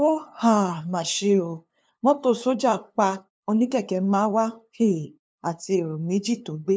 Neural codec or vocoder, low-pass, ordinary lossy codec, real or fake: codec, 16 kHz, 2 kbps, FunCodec, trained on LibriTTS, 25 frames a second; none; none; fake